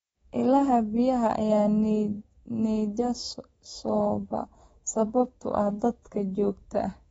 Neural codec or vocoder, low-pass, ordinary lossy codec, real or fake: codec, 24 kHz, 3.1 kbps, DualCodec; 10.8 kHz; AAC, 24 kbps; fake